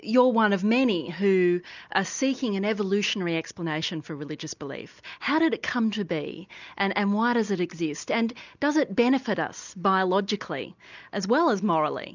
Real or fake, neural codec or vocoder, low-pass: real; none; 7.2 kHz